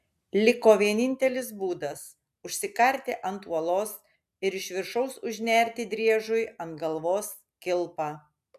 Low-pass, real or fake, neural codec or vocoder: 14.4 kHz; real; none